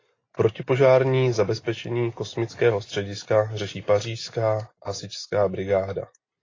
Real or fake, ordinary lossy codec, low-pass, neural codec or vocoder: real; AAC, 32 kbps; 7.2 kHz; none